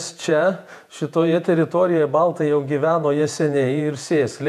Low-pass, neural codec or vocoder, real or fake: 14.4 kHz; vocoder, 48 kHz, 128 mel bands, Vocos; fake